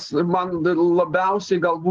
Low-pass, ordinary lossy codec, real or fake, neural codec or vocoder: 7.2 kHz; Opus, 16 kbps; real; none